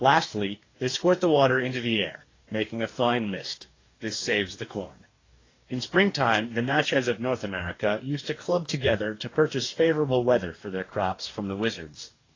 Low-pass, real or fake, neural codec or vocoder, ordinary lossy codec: 7.2 kHz; fake; codec, 44.1 kHz, 2.6 kbps, DAC; AAC, 32 kbps